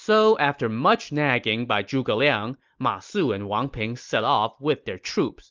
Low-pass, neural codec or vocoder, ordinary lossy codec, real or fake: 7.2 kHz; none; Opus, 24 kbps; real